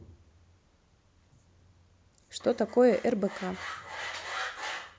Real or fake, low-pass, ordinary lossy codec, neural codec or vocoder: real; none; none; none